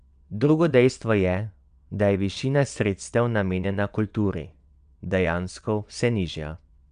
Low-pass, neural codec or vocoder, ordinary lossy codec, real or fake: 9.9 kHz; vocoder, 22.05 kHz, 80 mel bands, WaveNeXt; none; fake